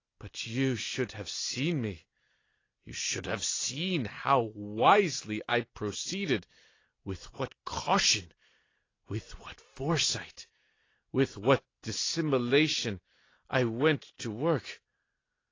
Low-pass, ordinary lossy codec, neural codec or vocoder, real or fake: 7.2 kHz; AAC, 32 kbps; none; real